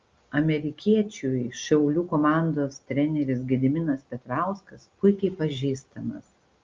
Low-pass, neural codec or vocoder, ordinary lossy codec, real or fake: 7.2 kHz; none; Opus, 32 kbps; real